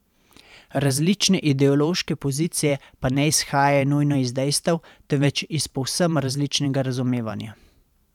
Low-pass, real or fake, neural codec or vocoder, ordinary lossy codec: 19.8 kHz; fake; vocoder, 44.1 kHz, 128 mel bands every 512 samples, BigVGAN v2; none